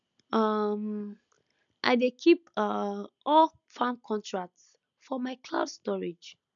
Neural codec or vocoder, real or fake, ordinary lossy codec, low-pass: none; real; none; 7.2 kHz